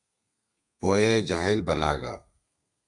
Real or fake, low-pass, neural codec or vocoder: fake; 10.8 kHz; codec, 32 kHz, 1.9 kbps, SNAC